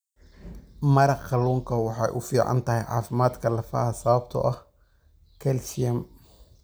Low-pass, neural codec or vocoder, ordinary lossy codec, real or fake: none; none; none; real